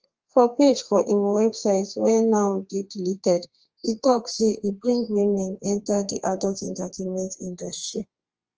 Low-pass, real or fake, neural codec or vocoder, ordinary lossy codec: 7.2 kHz; fake; codec, 32 kHz, 1.9 kbps, SNAC; Opus, 24 kbps